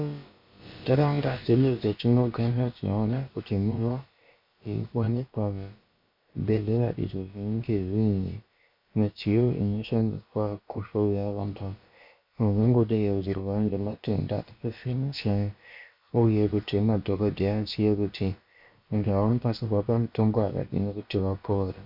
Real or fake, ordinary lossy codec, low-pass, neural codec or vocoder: fake; MP3, 48 kbps; 5.4 kHz; codec, 16 kHz, about 1 kbps, DyCAST, with the encoder's durations